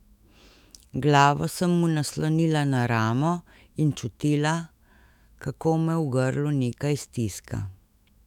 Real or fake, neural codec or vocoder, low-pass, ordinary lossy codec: fake; autoencoder, 48 kHz, 128 numbers a frame, DAC-VAE, trained on Japanese speech; 19.8 kHz; none